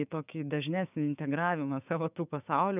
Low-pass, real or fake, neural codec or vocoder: 3.6 kHz; fake; codec, 16 kHz, 6 kbps, DAC